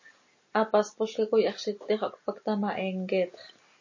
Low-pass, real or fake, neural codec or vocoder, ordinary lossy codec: 7.2 kHz; real; none; MP3, 32 kbps